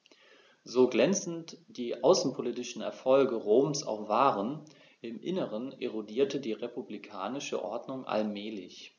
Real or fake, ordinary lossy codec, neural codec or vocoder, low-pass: real; none; none; 7.2 kHz